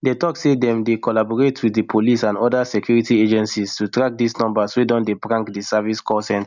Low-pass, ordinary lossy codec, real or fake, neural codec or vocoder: 7.2 kHz; none; real; none